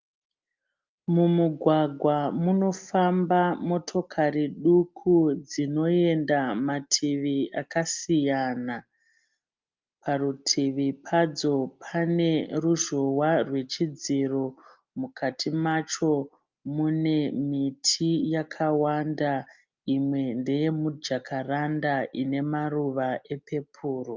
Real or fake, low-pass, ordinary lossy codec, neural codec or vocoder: real; 7.2 kHz; Opus, 24 kbps; none